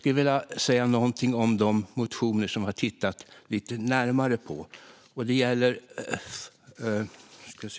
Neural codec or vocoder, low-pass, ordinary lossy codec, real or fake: none; none; none; real